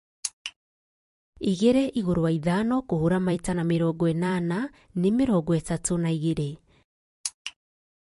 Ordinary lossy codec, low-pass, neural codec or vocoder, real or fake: MP3, 48 kbps; 14.4 kHz; vocoder, 44.1 kHz, 128 mel bands every 256 samples, BigVGAN v2; fake